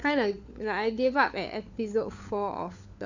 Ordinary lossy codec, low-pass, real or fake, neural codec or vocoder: none; 7.2 kHz; fake; codec, 16 kHz, 4 kbps, FunCodec, trained on LibriTTS, 50 frames a second